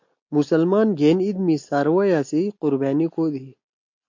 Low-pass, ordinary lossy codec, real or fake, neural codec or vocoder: 7.2 kHz; MP3, 48 kbps; real; none